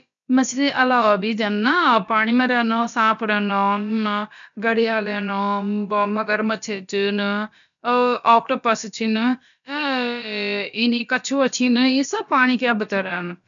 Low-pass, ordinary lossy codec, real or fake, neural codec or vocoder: 7.2 kHz; none; fake; codec, 16 kHz, about 1 kbps, DyCAST, with the encoder's durations